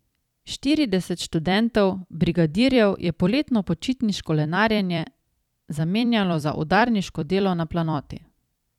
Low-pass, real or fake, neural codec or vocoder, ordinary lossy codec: 19.8 kHz; fake; vocoder, 44.1 kHz, 128 mel bands every 256 samples, BigVGAN v2; none